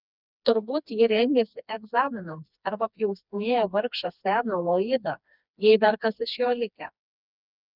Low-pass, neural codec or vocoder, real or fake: 5.4 kHz; codec, 16 kHz, 2 kbps, FreqCodec, smaller model; fake